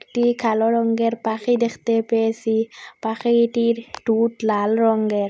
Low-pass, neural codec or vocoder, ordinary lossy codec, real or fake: none; none; none; real